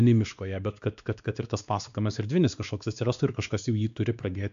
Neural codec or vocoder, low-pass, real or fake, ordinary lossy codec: codec, 16 kHz, 2 kbps, X-Codec, WavLM features, trained on Multilingual LibriSpeech; 7.2 kHz; fake; AAC, 96 kbps